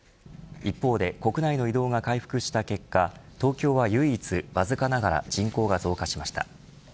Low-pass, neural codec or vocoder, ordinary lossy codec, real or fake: none; none; none; real